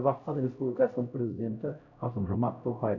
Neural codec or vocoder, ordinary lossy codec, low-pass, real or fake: codec, 16 kHz, 0.5 kbps, X-Codec, HuBERT features, trained on LibriSpeech; none; 7.2 kHz; fake